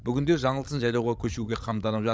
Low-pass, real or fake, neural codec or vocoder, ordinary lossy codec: none; fake; codec, 16 kHz, 16 kbps, FreqCodec, larger model; none